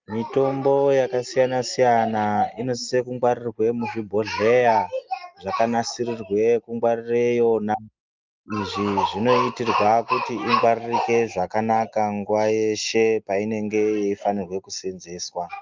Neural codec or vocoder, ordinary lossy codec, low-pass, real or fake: none; Opus, 32 kbps; 7.2 kHz; real